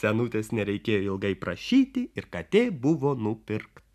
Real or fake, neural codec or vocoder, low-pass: real; none; 14.4 kHz